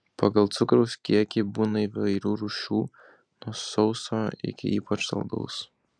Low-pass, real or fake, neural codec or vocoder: 9.9 kHz; real; none